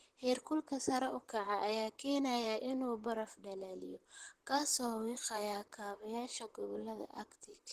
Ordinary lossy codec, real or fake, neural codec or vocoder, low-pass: Opus, 16 kbps; fake; vocoder, 44.1 kHz, 128 mel bands, Pupu-Vocoder; 14.4 kHz